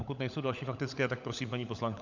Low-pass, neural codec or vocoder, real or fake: 7.2 kHz; codec, 16 kHz, 16 kbps, FunCodec, trained on LibriTTS, 50 frames a second; fake